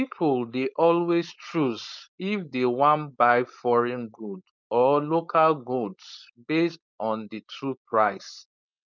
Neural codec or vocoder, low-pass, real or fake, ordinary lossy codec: codec, 16 kHz, 4.8 kbps, FACodec; 7.2 kHz; fake; none